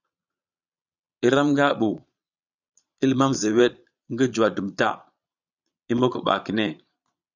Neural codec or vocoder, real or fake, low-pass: vocoder, 22.05 kHz, 80 mel bands, Vocos; fake; 7.2 kHz